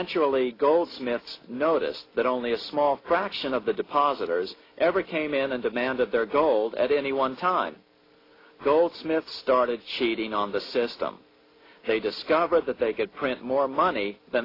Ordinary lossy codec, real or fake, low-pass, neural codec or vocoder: AAC, 24 kbps; real; 5.4 kHz; none